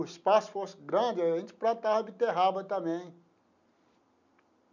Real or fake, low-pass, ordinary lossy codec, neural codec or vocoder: real; 7.2 kHz; none; none